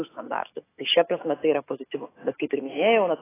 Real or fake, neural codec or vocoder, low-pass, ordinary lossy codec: fake; autoencoder, 48 kHz, 128 numbers a frame, DAC-VAE, trained on Japanese speech; 3.6 kHz; AAC, 16 kbps